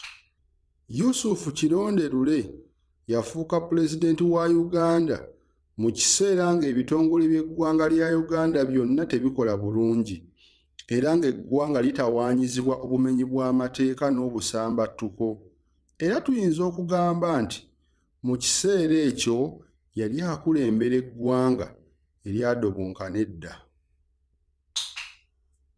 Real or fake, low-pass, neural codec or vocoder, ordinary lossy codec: fake; none; vocoder, 22.05 kHz, 80 mel bands, Vocos; none